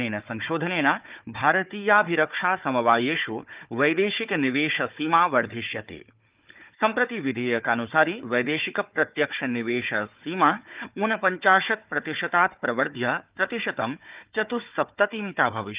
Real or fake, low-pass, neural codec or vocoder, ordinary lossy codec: fake; 3.6 kHz; codec, 16 kHz, 4 kbps, FunCodec, trained on Chinese and English, 50 frames a second; Opus, 32 kbps